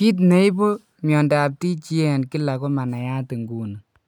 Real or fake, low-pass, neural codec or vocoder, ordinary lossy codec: real; 19.8 kHz; none; none